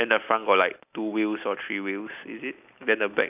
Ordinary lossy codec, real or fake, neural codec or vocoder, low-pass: none; real; none; 3.6 kHz